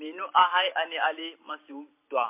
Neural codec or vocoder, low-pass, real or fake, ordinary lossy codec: vocoder, 44.1 kHz, 128 mel bands every 512 samples, BigVGAN v2; 3.6 kHz; fake; MP3, 24 kbps